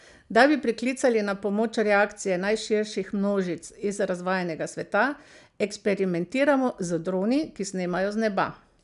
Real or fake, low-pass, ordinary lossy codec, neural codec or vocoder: real; 10.8 kHz; none; none